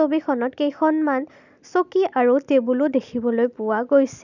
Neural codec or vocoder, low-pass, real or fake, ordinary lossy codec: none; 7.2 kHz; real; none